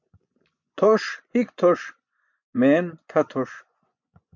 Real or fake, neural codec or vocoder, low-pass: fake; vocoder, 24 kHz, 100 mel bands, Vocos; 7.2 kHz